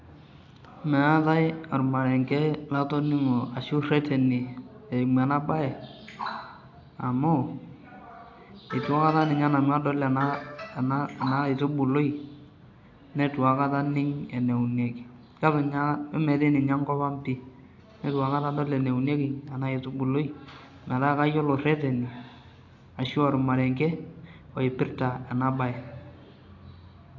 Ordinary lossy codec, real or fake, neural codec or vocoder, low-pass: AAC, 48 kbps; real; none; 7.2 kHz